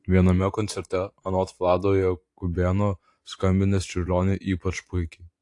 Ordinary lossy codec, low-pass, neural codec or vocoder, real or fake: AAC, 48 kbps; 10.8 kHz; vocoder, 44.1 kHz, 128 mel bands every 512 samples, BigVGAN v2; fake